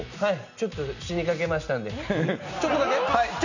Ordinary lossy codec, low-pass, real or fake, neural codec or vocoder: none; 7.2 kHz; real; none